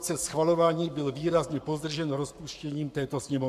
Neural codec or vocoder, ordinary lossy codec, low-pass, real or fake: codec, 44.1 kHz, 7.8 kbps, Pupu-Codec; AAC, 96 kbps; 14.4 kHz; fake